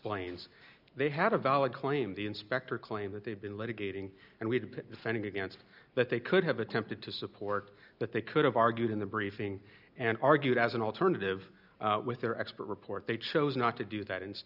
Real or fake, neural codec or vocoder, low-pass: real; none; 5.4 kHz